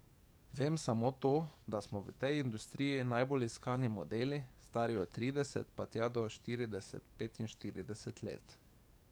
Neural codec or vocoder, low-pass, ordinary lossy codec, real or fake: codec, 44.1 kHz, 7.8 kbps, DAC; none; none; fake